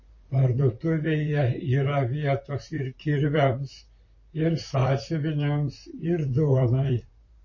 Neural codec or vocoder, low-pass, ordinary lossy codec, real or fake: vocoder, 44.1 kHz, 128 mel bands, Pupu-Vocoder; 7.2 kHz; MP3, 32 kbps; fake